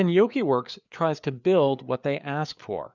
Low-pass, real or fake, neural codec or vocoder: 7.2 kHz; fake; codec, 16 kHz, 4 kbps, FreqCodec, larger model